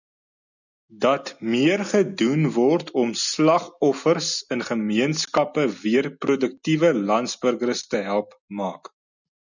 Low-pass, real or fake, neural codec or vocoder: 7.2 kHz; real; none